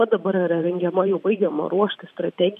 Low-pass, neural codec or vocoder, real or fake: 14.4 kHz; vocoder, 44.1 kHz, 128 mel bands every 512 samples, BigVGAN v2; fake